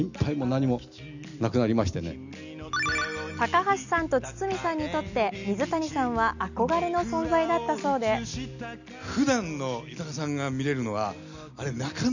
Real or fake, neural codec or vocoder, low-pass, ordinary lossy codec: real; none; 7.2 kHz; none